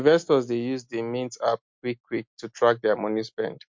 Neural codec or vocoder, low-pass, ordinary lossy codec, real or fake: none; 7.2 kHz; MP3, 48 kbps; real